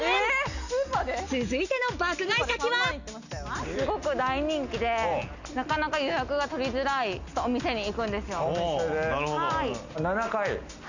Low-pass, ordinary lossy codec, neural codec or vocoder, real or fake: 7.2 kHz; none; none; real